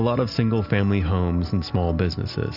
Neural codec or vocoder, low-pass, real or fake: none; 5.4 kHz; real